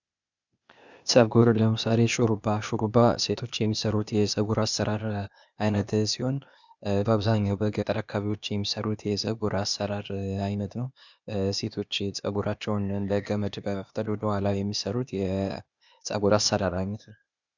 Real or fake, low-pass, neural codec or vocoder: fake; 7.2 kHz; codec, 16 kHz, 0.8 kbps, ZipCodec